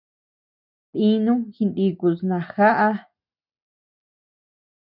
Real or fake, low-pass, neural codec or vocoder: real; 5.4 kHz; none